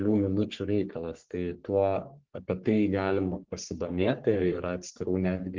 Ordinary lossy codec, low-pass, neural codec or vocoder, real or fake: Opus, 16 kbps; 7.2 kHz; codec, 44.1 kHz, 3.4 kbps, Pupu-Codec; fake